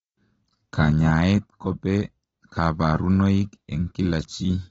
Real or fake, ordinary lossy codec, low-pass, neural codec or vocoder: real; AAC, 24 kbps; 10.8 kHz; none